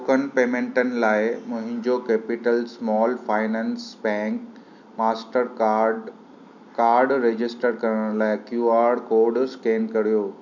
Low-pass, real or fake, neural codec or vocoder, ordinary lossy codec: 7.2 kHz; real; none; none